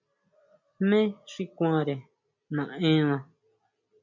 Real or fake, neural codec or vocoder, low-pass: real; none; 7.2 kHz